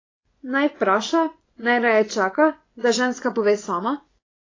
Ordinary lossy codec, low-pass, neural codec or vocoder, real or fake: AAC, 32 kbps; 7.2 kHz; vocoder, 24 kHz, 100 mel bands, Vocos; fake